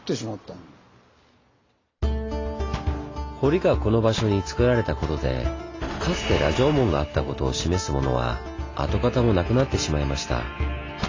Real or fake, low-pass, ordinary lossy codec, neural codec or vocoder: real; 7.2 kHz; AAC, 32 kbps; none